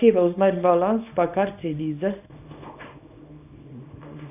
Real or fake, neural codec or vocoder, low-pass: fake; codec, 24 kHz, 0.9 kbps, WavTokenizer, small release; 3.6 kHz